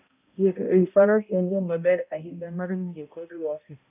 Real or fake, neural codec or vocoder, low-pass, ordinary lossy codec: fake; codec, 16 kHz, 0.5 kbps, X-Codec, HuBERT features, trained on balanced general audio; 3.6 kHz; none